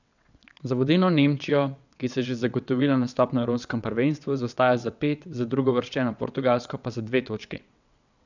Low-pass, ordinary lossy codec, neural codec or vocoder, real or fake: 7.2 kHz; none; vocoder, 22.05 kHz, 80 mel bands, WaveNeXt; fake